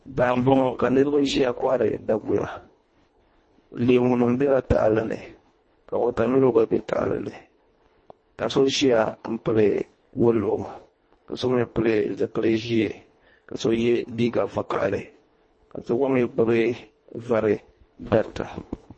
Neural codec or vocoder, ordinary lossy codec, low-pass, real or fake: codec, 24 kHz, 1.5 kbps, HILCodec; MP3, 32 kbps; 9.9 kHz; fake